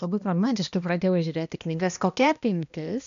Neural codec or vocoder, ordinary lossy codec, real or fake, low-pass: codec, 16 kHz, 1 kbps, X-Codec, HuBERT features, trained on balanced general audio; MP3, 96 kbps; fake; 7.2 kHz